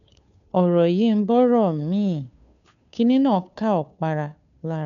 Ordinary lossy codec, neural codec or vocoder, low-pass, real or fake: MP3, 96 kbps; codec, 16 kHz, 2 kbps, FunCodec, trained on Chinese and English, 25 frames a second; 7.2 kHz; fake